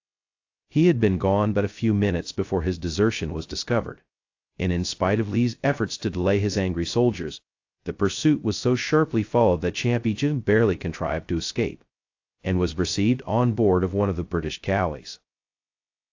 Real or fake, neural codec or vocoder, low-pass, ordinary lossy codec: fake; codec, 16 kHz, 0.2 kbps, FocalCodec; 7.2 kHz; AAC, 48 kbps